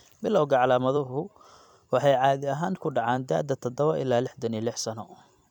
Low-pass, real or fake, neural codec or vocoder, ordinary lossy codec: 19.8 kHz; fake; vocoder, 44.1 kHz, 128 mel bands every 256 samples, BigVGAN v2; none